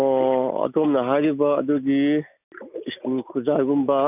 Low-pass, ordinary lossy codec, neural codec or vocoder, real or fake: 3.6 kHz; none; none; real